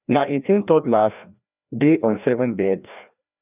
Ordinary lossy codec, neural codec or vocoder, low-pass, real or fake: none; codec, 16 kHz, 1 kbps, FreqCodec, larger model; 3.6 kHz; fake